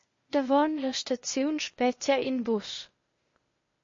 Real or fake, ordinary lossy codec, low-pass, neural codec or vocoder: fake; MP3, 32 kbps; 7.2 kHz; codec, 16 kHz, 0.8 kbps, ZipCodec